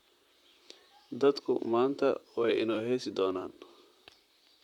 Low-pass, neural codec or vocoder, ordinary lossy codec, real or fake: 19.8 kHz; vocoder, 44.1 kHz, 128 mel bands, Pupu-Vocoder; none; fake